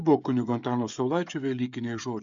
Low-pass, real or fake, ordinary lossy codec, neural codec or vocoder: 7.2 kHz; fake; Opus, 64 kbps; codec, 16 kHz, 16 kbps, FreqCodec, smaller model